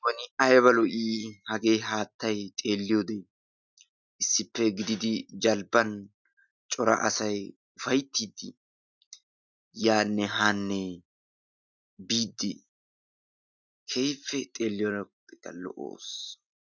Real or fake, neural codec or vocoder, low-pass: real; none; 7.2 kHz